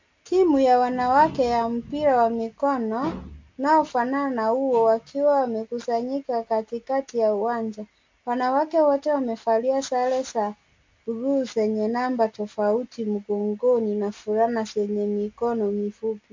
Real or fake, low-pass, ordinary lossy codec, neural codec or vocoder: real; 7.2 kHz; MP3, 48 kbps; none